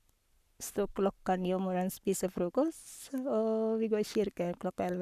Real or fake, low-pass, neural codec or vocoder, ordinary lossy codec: fake; 14.4 kHz; codec, 44.1 kHz, 7.8 kbps, Pupu-Codec; none